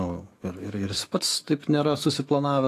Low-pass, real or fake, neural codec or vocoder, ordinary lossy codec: 14.4 kHz; fake; autoencoder, 48 kHz, 128 numbers a frame, DAC-VAE, trained on Japanese speech; AAC, 48 kbps